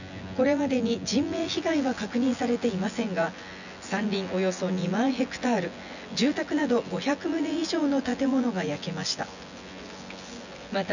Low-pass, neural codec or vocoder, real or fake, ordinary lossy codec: 7.2 kHz; vocoder, 24 kHz, 100 mel bands, Vocos; fake; none